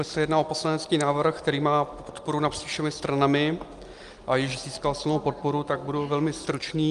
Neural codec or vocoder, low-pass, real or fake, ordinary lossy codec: none; 10.8 kHz; real; Opus, 32 kbps